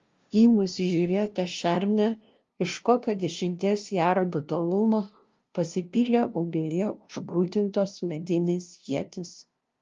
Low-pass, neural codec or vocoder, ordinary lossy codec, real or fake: 7.2 kHz; codec, 16 kHz, 0.5 kbps, FunCodec, trained on LibriTTS, 25 frames a second; Opus, 32 kbps; fake